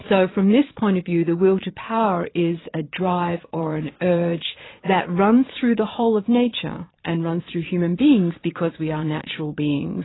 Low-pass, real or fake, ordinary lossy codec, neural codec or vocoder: 7.2 kHz; fake; AAC, 16 kbps; vocoder, 22.05 kHz, 80 mel bands, Vocos